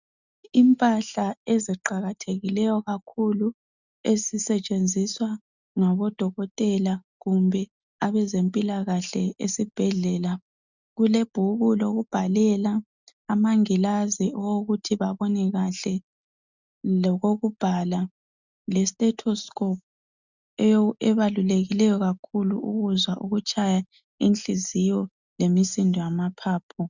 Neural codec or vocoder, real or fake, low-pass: none; real; 7.2 kHz